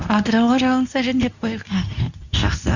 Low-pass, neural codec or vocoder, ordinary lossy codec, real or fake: 7.2 kHz; codec, 24 kHz, 0.9 kbps, WavTokenizer, medium speech release version 2; none; fake